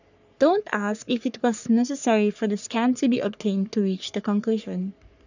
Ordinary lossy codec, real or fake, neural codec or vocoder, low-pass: none; fake; codec, 44.1 kHz, 3.4 kbps, Pupu-Codec; 7.2 kHz